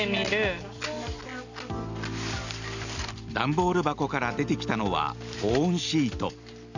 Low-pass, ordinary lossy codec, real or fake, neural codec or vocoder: 7.2 kHz; none; real; none